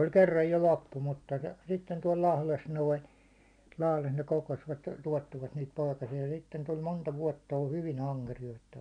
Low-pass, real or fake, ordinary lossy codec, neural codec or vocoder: 9.9 kHz; real; none; none